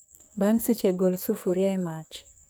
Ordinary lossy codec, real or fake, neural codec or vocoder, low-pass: none; fake; codec, 44.1 kHz, 2.6 kbps, SNAC; none